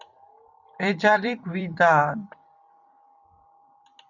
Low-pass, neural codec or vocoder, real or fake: 7.2 kHz; vocoder, 44.1 kHz, 128 mel bands every 256 samples, BigVGAN v2; fake